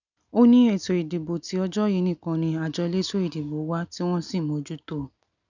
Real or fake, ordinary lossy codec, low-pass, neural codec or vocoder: real; none; 7.2 kHz; none